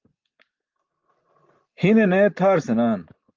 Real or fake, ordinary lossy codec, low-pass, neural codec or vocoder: real; Opus, 32 kbps; 7.2 kHz; none